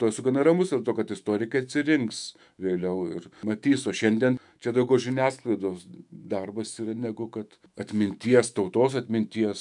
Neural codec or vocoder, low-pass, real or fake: autoencoder, 48 kHz, 128 numbers a frame, DAC-VAE, trained on Japanese speech; 10.8 kHz; fake